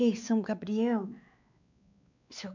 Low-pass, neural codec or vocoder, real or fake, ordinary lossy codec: 7.2 kHz; codec, 16 kHz, 4 kbps, X-Codec, WavLM features, trained on Multilingual LibriSpeech; fake; none